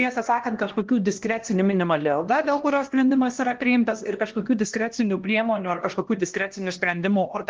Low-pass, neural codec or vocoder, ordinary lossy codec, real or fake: 7.2 kHz; codec, 16 kHz, 1 kbps, X-Codec, HuBERT features, trained on LibriSpeech; Opus, 16 kbps; fake